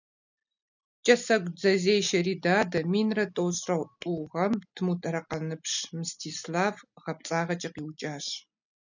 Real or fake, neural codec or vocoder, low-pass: real; none; 7.2 kHz